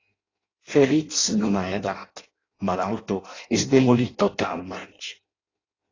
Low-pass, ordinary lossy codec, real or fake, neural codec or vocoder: 7.2 kHz; AAC, 32 kbps; fake; codec, 16 kHz in and 24 kHz out, 0.6 kbps, FireRedTTS-2 codec